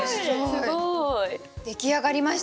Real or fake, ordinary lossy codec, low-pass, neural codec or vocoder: real; none; none; none